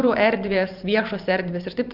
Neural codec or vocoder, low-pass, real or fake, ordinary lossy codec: vocoder, 44.1 kHz, 128 mel bands every 512 samples, BigVGAN v2; 5.4 kHz; fake; Opus, 24 kbps